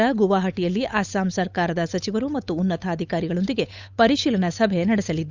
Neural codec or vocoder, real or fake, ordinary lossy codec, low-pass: codec, 16 kHz, 8 kbps, FunCodec, trained on Chinese and English, 25 frames a second; fake; Opus, 64 kbps; 7.2 kHz